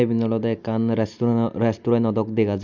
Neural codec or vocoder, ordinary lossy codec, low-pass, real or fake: none; none; 7.2 kHz; real